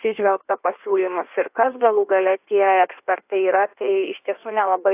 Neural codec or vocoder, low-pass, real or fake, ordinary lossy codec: codec, 16 kHz in and 24 kHz out, 1.1 kbps, FireRedTTS-2 codec; 3.6 kHz; fake; AAC, 32 kbps